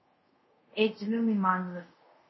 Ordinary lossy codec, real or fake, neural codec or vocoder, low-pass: MP3, 24 kbps; fake; codec, 24 kHz, 0.5 kbps, DualCodec; 7.2 kHz